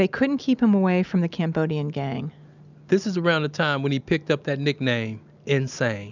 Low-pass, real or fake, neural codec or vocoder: 7.2 kHz; real; none